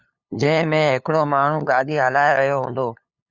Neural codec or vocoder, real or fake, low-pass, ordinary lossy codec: codec, 16 kHz, 2 kbps, FunCodec, trained on LibriTTS, 25 frames a second; fake; 7.2 kHz; Opus, 64 kbps